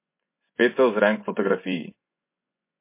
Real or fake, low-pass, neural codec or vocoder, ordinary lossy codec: fake; 3.6 kHz; vocoder, 24 kHz, 100 mel bands, Vocos; MP3, 24 kbps